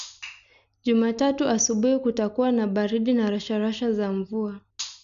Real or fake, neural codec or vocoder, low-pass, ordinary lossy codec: real; none; 7.2 kHz; none